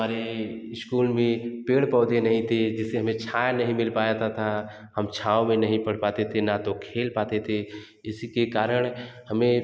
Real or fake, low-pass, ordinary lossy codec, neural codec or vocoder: real; none; none; none